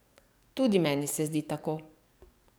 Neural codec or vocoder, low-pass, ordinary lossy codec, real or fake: codec, 44.1 kHz, 7.8 kbps, DAC; none; none; fake